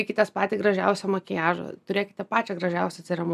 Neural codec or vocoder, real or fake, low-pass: none; real; 14.4 kHz